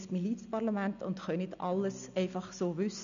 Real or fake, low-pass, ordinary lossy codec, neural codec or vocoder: real; 7.2 kHz; none; none